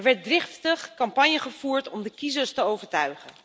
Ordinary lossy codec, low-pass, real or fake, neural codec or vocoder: none; none; real; none